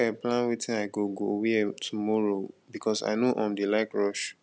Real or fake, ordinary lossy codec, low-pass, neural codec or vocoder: real; none; none; none